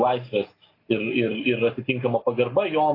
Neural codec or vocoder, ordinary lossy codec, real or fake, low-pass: none; AAC, 24 kbps; real; 5.4 kHz